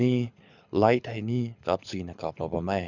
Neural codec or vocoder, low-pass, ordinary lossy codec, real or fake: codec, 24 kHz, 6 kbps, HILCodec; 7.2 kHz; none; fake